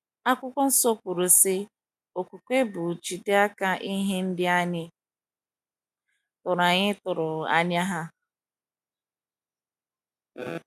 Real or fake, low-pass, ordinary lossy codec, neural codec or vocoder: real; 14.4 kHz; none; none